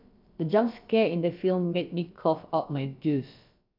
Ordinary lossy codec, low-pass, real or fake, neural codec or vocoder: MP3, 48 kbps; 5.4 kHz; fake; codec, 16 kHz, about 1 kbps, DyCAST, with the encoder's durations